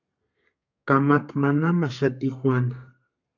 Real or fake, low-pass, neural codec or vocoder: fake; 7.2 kHz; codec, 32 kHz, 1.9 kbps, SNAC